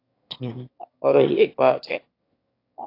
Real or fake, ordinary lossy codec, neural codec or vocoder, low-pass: fake; AAC, 32 kbps; autoencoder, 22.05 kHz, a latent of 192 numbers a frame, VITS, trained on one speaker; 5.4 kHz